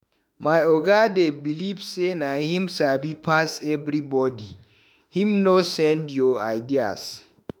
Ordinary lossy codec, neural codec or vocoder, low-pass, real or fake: none; autoencoder, 48 kHz, 32 numbers a frame, DAC-VAE, trained on Japanese speech; none; fake